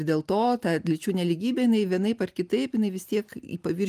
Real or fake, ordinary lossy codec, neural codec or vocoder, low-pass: real; Opus, 32 kbps; none; 14.4 kHz